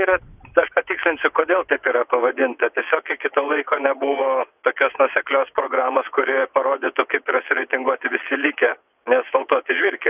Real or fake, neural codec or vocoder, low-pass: fake; vocoder, 22.05 kHz, 80 mel bands, WaveNeXt; 3.6 kHz